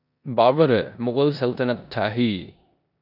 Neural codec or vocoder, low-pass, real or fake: codec, 16 kHz in and 24 kHz out, 0.9 kbps, LongCat-Audio-Codec, four codebook decoder; 5.4 kHz; fake